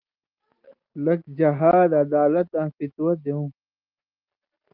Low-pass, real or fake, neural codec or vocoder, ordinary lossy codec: 5.4 kHz; real; none; Opus, 32 kbps